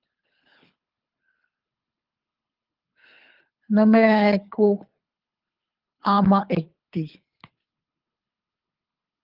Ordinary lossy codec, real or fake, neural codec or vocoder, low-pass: Opus, 32 kbps; fake; codec, 24 kHz, 3 kbps, HILCodec; 5.4 kHz